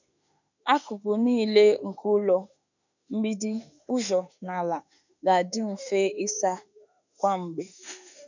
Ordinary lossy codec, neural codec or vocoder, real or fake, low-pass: none; autoencoder, 48 kHz, 32 numbers a frame, DAC-VAE, trained on Japanese speech; fake; 7.2 kHz